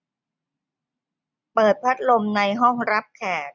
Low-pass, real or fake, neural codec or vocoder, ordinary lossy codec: 7.2 kHz; real; none; none